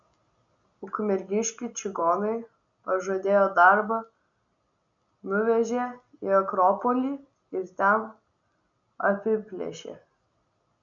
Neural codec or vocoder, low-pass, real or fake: none; 7.2 kHz; real